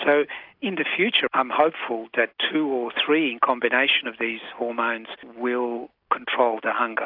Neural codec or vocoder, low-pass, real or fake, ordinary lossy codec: none; 5.4 kHz; real; AAC, 48 kbps